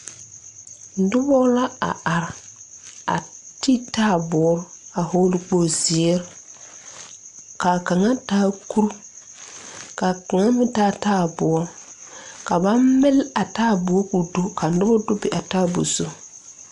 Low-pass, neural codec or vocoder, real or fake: 10.8 kHz; none; real